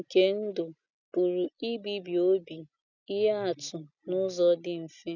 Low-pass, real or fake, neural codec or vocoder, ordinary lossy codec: 7.2 kHz; real; none; none